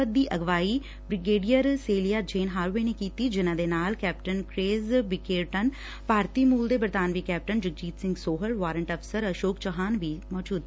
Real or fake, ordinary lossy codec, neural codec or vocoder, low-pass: real; none; none; none